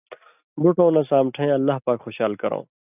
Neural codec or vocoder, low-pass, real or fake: none; 3.6 kHz; real